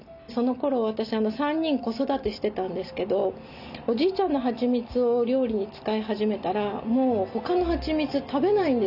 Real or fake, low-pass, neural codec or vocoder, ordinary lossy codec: real; 5.4 kHz; none; none